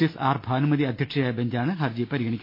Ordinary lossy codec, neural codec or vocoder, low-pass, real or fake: MP3, 32 kbps; none; 5.4 kHz; real